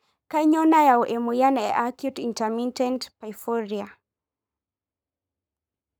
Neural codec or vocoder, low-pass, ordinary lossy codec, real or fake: codec, 44.1 kHz, 7.8 kbps, Pupu-Codec; none; none; fake